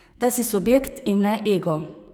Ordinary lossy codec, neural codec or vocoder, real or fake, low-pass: none; codec, 44.1 kHz, 2.6 kbps, SNAC; fake; none